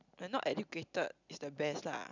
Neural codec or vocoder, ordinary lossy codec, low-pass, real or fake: none; none; 7.2 kHz; real